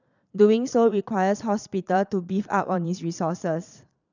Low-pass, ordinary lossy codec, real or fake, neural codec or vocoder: 7.2 kHz; none; fake; vocoder, 22.05 kHz, 80 mel bands, Vocos